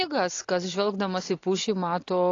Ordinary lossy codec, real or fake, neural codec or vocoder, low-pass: AAC, 32 kbps; real; none; 7.2 kHz